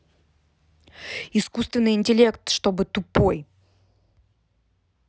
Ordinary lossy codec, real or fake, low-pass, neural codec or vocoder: none; real; none; none